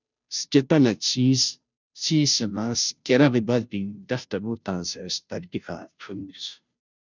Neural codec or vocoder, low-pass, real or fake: codec, 16 kHz, 0.5 kbps, FunCodec, trained on Chinese and English, 25 frames a second; 7.2 kHz; fake